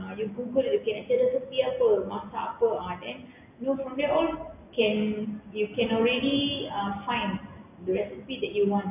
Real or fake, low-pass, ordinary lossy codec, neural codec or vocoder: real; 3.6 kHz; none; none